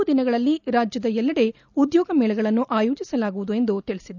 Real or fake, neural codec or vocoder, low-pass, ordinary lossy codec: real; none; 7.2 kHz; none